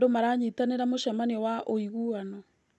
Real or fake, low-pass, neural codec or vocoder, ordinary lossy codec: real; none; none; none